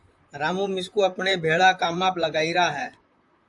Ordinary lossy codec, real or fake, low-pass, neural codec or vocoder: AAC, 64 kbps; fake; 10.8 kHz; vocoder, 44.1 kHz, 128 mel bands, Pupu-Vocoder